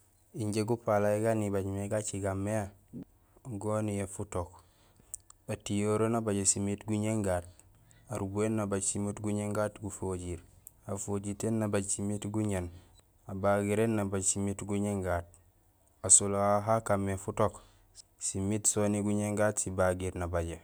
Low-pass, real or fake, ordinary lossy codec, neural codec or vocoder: none; real; none; none